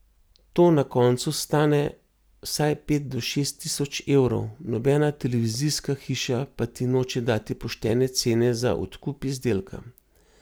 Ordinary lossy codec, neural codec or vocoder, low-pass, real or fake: none; none; none; real